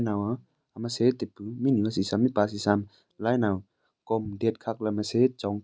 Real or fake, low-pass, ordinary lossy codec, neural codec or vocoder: real; none; none; none